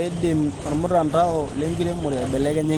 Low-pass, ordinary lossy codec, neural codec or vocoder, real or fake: 19.8 kHz; Opus, 16 kbps; none; real